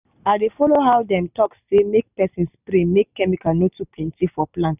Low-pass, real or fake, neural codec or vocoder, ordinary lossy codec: 3.6 kHz; real; none; none